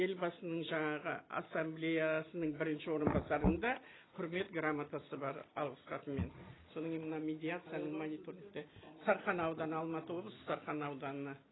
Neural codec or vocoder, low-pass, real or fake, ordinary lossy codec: none; 7.2 kHz; real; AAC, 16 kbps